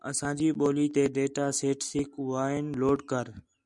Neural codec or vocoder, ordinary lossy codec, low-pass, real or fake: none; MP3, 96 kbps; 10.8 kHz; real